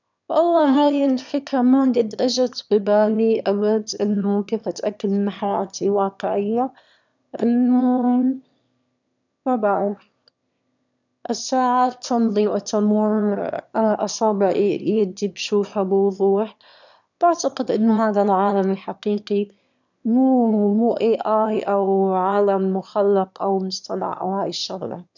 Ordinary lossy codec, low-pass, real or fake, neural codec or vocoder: none; 7.2 kHz; fake; autoencoder, 22.05 kHz, a latent of 192 numbers a frame, VITS, trained on one speaker